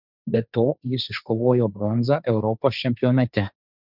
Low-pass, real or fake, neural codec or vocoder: 5.4 kHz; fake; codec, 16 kHz, 1.1 kbps, Voila-Tokenizer